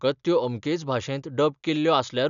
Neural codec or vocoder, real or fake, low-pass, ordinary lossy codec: none; real; 7.2 kHz; none